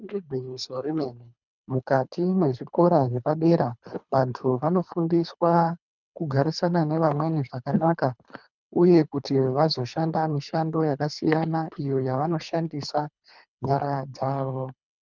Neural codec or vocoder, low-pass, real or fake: codec, 24 kHz, 3 kbps, HILCodec; 7.2 kHz; fake